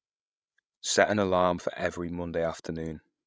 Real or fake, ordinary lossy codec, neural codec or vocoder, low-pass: fake; none; codec, 16 kHz, 16 kbps, FreqCodec, larger model; none